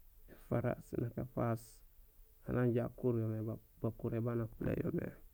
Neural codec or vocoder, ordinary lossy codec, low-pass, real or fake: none; none; none; real